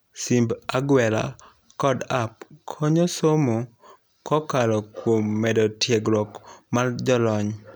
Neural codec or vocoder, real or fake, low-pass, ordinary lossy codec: none; real; none; none